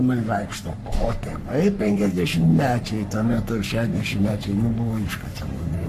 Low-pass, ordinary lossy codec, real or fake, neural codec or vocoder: 14.4 kHz; AAC, 96 kbps; fake; codec, 44.1 kHz, 3.4 kbps, Pupu-Codec